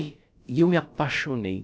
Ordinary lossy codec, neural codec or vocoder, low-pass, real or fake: none; codec, 16 kHz, about 1 kbps, DyCAST, with the encoder's durations; none; fake